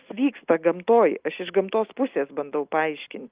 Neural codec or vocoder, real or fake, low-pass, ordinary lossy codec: none; real; 3.6 kHz; Opus, 24 kbps